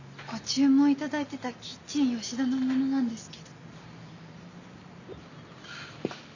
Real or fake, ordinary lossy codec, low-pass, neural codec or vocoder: real; none; 7.2 kHz; none